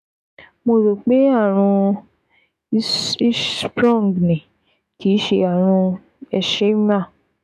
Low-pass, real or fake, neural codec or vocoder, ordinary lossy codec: 14.4 kHz; fake; autoencoder, 48 kHz, 128 numbers a frame, DAC-VAE, trained on Japanese speech; none